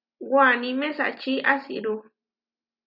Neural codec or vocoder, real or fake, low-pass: none; real; 5.4 kHz